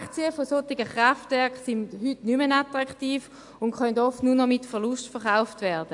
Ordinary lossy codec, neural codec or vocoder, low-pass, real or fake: AAC, 64 kbps; none; 10.8 kHz; real